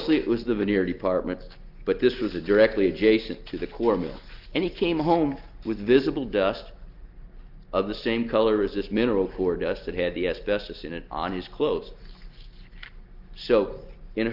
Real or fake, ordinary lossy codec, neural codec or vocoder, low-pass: real; Opus, 24 kbps; none; 5.4 kHz